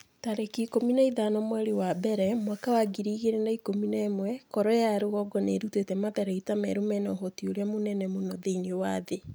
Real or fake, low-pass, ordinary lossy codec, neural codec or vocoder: real; none; none; none